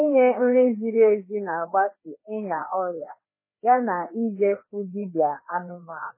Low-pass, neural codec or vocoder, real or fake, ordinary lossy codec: 3.6 kHz; codec, 16 kHz, 2 kbps, FreqCodec, larger model; fake; MP3, 16 kbps